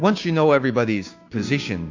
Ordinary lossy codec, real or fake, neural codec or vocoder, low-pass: AAC, 48 kbps; fake; codec, 16 kHz, 6 kbps, DAC; 7.2 kHz